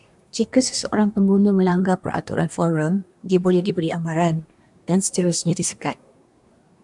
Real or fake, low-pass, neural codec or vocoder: fake; 10.8 kHz; codec, 24 kHz, 1 kbps, SNAC